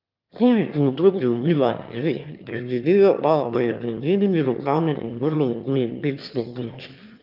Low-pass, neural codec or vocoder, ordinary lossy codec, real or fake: 5.4 kHz; autoencoder, 22.05 kHz, a latent of 192 numbers a frame, VITS, trained on one speaker; Opus, 24 kbps; fake